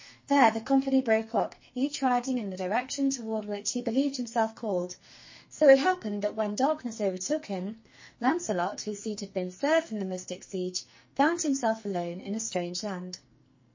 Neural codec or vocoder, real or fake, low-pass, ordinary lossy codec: codec, 44.1 kHz, 2.6 kbps, SNAC; fake; 7.2 kHz; MP3, 32 kbps